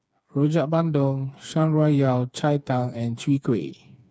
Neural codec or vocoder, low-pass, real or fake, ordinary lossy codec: codec, 16 kHz, 4 kbps, FreqCodec, smaller model; none; fake; none